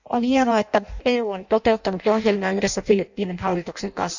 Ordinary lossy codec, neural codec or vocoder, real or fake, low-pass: none; codec, 16 kHz in and 24 kHz out, 0.6 kbps, FireRedTTS-2 codec; fake; 7.2 kHz